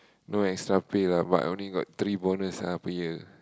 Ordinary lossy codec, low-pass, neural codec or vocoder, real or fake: none; none; none; real